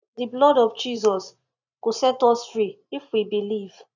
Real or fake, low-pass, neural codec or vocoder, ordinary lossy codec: fake; 7.2 kHz; vocoder, 44.1 kHz, 128 mel bands every 512 samples, BigVGAN v2; none